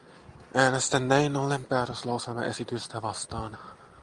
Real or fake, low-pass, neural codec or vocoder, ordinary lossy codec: real; 10.8 kHz; none; Opus, 32 kbps